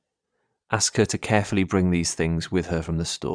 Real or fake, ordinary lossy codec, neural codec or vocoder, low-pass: fake; none; vocoder, 22.05 kHz, 80 mel bands, Vocos; 9.9 kHz